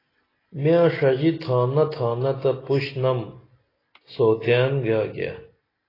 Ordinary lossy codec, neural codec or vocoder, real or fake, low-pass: AAC, 24 kbps; none; real; 5.4 kHz